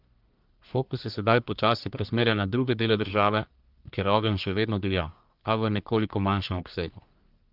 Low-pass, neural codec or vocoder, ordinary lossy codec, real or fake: 5.4 kHz; codec, 44.1 kHz, 1.7 kbps, Pupu-Codec; Opus, 32 kbps; fake